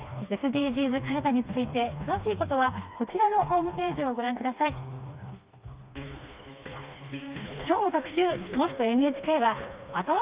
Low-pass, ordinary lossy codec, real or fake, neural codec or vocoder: 3.6 kHz; Opus, 64 kbps; fake; codec, 16 kHz, 2 kbps, FreqCodec, smaller model